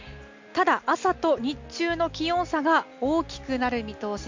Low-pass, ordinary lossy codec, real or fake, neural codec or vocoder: 7.2 kHz; MP3, 48 kbps; real; none